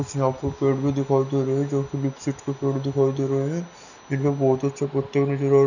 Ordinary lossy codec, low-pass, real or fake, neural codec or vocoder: none; 7.2 kHz; real; none